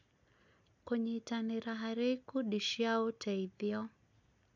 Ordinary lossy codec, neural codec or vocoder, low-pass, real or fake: none; none; 7.2 kHz; real